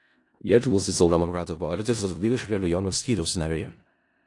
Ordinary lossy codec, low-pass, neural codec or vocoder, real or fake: AAC, 48 kbps; 10.8 kHz; codec, 16 kHz in and 24 kHz out, 0.4 kbps, LongCat-Audio-Codec, four codebook decoder; fake